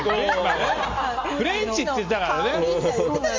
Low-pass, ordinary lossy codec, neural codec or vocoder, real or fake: 7.2 kHz; Opus, 32 kbps; none; real